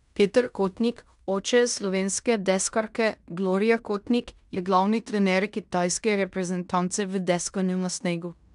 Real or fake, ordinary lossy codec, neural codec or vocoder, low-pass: fake; none; codec, 16 kHz in and 24 kHz out, 0.9 kbps, LongCat-Audio-Codec, fine tuned four codebook decoder; 10.8 kHz